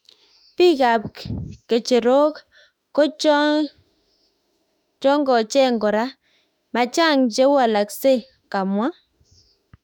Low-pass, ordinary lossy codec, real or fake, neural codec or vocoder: 19.8 kHz; none; fake; autoencoder, 48 kHz, 32 numbers a frame, DAC-VAE, trained on Japanese speech